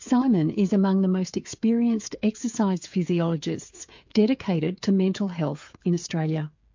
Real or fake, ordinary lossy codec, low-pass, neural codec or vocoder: fake; MP3, 48 kbps; 7.2 kHz; codec, 16 kHz, 8 kbps, FreqCodec, smaller model